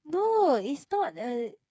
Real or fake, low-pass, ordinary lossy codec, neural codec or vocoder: fake; none; none; codec, 16 kHz, 4 kbps, FreqCodec, smaller model